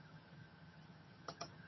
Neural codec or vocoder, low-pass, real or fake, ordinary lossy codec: vocoder, 22.05 kHz, 80 mel bands, HiFi-GAN; 7.2 kHz; fake; MP3, 24 kbps